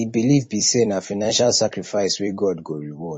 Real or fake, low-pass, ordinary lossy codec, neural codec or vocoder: fake; 9.9 kHz; MP3, 32 kbps; vocoder, 44.1 kHz, 128 mel bands every 256 samples, BigVGAN v2